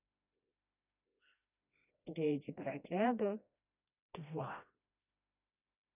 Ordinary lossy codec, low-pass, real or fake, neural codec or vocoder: none; 3.6 kHz; fake; codec, 16 kHz, 1 kbps, FreqCodec, smaller model